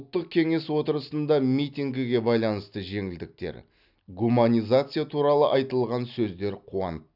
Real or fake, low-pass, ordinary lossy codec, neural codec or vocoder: real; 5.4 kHz; none; none